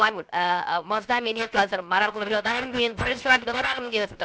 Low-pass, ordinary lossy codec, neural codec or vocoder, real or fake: none; none; codec, 16 kHz, 0.7 kbps, FocalCodec; fake